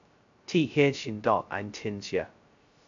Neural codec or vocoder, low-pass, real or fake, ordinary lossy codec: codec, 16 kHz, 0.2 kbps, FocalCodec; 7.2 kHz; fake; AAC, 64 kbps